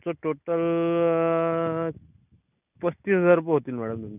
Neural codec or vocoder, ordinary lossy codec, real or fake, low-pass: none; none; real; 3.6 kHz